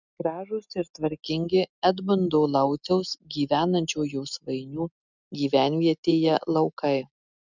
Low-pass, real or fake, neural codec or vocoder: 7.2 kHz; real; none